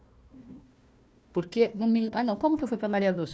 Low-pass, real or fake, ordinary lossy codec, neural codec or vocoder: none; fake; none; codec, 16 kHz, 1 kbps, FunCodec, trained on Chinese and English, 50 frames a second